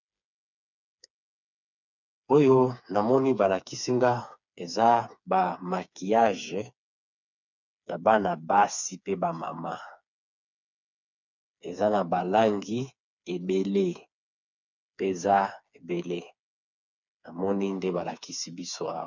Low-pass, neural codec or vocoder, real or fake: 7.2 kHz; codec, 16 kHz, 4 kbps, FreqCodec, smaller model; fake